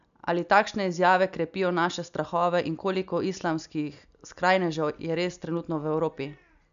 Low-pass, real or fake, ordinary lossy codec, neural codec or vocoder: 7.2 kHz; real; none; none